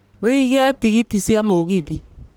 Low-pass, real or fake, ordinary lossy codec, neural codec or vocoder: none; fake; none; codec, 44.1 kHz, 1.7 kbps, Pupu-Codec